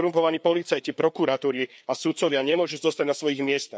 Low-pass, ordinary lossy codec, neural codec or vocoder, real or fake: none; none; codec, 16 kHz, 4 kbps, FreqCodec, larger model; fake